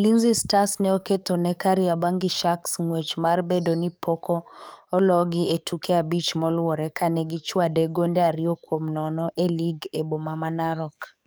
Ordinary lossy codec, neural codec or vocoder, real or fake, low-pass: none; codec, 44.1 kHz, 7.8 kbps, DAC; fake; none